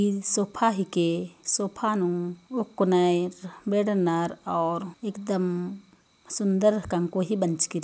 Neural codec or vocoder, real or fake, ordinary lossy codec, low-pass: none; real; none; none